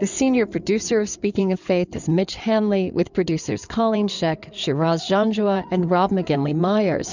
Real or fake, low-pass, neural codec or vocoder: fake; 7.2 kHz; codec, 16 kHz in and 24 kHz out, 2.2 kbps, FireRedTTS-2 codec